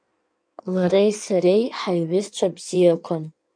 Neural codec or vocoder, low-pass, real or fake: codec, 16 kHz in and 24 kHz out, 1.1 kbps, FireRedTTS-2 codec; 9.9 kHz; fake